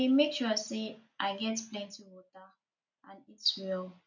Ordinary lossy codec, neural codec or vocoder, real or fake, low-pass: none; none; real; 7.2 kHz